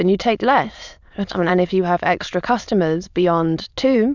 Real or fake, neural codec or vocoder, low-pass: fake; autoencoder, 22.05 kHz, a latent of 192 numbers a frame, VITS, trained on many speakers; 7.2 kHz